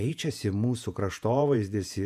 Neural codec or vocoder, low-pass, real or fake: vocoder, 48 kHz, 128 mel bands, Vocos; 14.4 kHz; fake